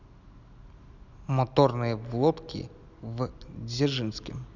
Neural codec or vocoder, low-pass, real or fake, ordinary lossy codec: none; 7.2 kHz; real; none